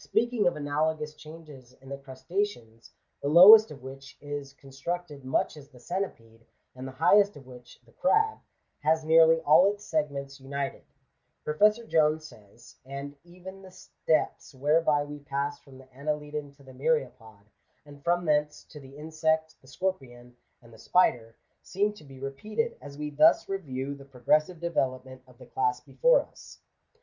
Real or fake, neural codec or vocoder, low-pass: real; none; 7.2 kHz